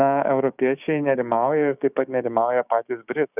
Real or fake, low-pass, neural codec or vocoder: fake; 3.6 kHz; codec, 16 kHz, 6 kbps, DAC